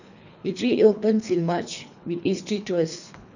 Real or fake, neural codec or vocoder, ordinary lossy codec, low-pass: fake; codec, 24 kHz, 3 kbps, HILCodec; none; 7.2 kHz